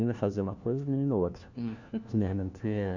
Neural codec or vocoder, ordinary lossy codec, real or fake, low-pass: codec, 16 kHz, 1 kbps, FunCodec, trained on LibriTTS, 50 frames a second; none; fake; 7.2 kHz